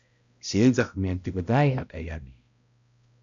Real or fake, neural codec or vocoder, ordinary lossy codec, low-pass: fake; codec, 16 kHz, 0.5 kbps, X-Codec, HuBERT features, trained on balanced general audio; MP3, 64 kbps; 7.2 kHz